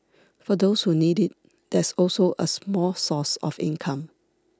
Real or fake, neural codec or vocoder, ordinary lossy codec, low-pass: real; none; none; none